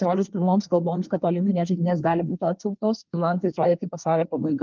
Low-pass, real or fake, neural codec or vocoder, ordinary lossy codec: 7.2 kHz; fake; codec, 24 kHz, 1 kbps, SNAC; Opus, 32 kbps